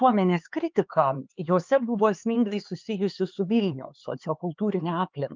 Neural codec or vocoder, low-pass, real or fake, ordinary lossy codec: codec, 16 kHz, 4 kbps, X-Codec, HuBERT features, trained on LibriSpeech; 7.2 kHz; fake; Opus, 24 kbps